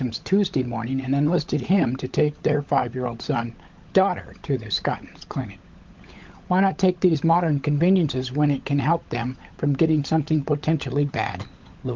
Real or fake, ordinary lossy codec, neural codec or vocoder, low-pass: fake; Opus, 32 kbps; codec, 16 kHz, 16 kbps, FunCodec, trained on LibriTTS, 50 frames a second; 7.2 kHz